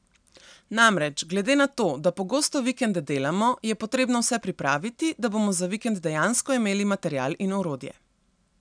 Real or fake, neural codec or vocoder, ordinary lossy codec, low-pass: real; none; none; 9.9 kHz